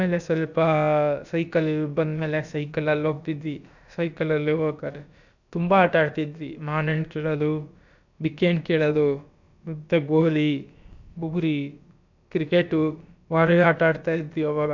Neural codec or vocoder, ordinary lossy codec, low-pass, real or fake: codec, 16 kHz, about 1 kbps, DyCAST, with the encoder's durations; none; 7.2 kHz; fake